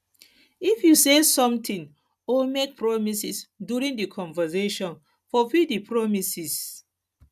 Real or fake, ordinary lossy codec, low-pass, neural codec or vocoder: real; none; 14.4 kHz; none